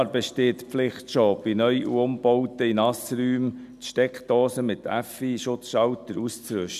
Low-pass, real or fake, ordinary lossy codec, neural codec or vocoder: 14.4 kHz; real; none; none